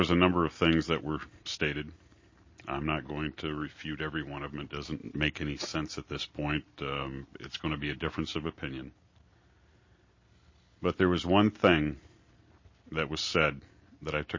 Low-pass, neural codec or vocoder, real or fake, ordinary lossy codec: 7.2 kHz; none; real; MP3, 32 kbps